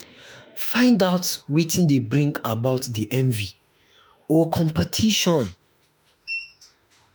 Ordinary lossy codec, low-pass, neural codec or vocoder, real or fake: none; none; autoencoder, 48 kHz, 32 numbers a frame, DAC-VAE, trained on Japanese speech; fake